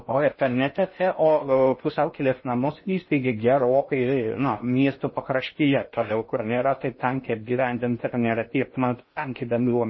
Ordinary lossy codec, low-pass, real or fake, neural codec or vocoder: MP3, 24 kbps; 7.2 kHz; fake; codec, 16 kHz in and 24 kHz out, 0.6 kbps, FocalCodec, streaming, 4096 codes